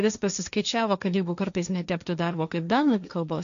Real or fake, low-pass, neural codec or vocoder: fake; 7.2 kHz; codec, 16 kHz, 1.1 kbps, Voila-Tokenizer